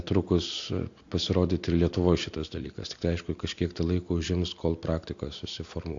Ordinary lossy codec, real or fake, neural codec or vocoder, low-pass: AAC, 48 kbps; real; none; 7.2 kHz